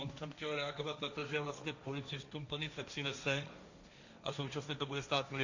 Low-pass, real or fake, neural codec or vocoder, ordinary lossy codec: 7.2 kHz; fake; codec, 16 kHz, 1.1 kbps, Voila-Tokenizer; Opus, 64 kbps